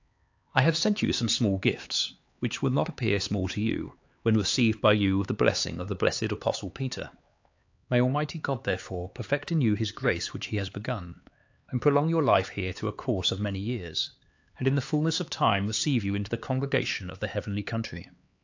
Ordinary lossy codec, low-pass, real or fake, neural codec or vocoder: AAC, 48 kbps; 7.2 kHz; fake; codec, 16 kHz, 4 kbps, X-Codec, HuBERT features, trained on LibriSpeech